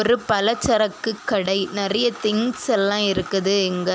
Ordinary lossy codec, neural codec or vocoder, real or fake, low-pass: none; none; real; none